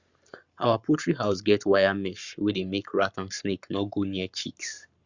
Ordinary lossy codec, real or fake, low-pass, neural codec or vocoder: Opus, 64 kbps; fake; 7.2 kHz; codec, 44.1 kHz, 7.8 kbps, Pupu-Codec